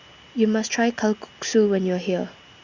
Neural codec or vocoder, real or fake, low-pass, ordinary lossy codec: none; real; 7.2 kHz; Opus, 64 kbps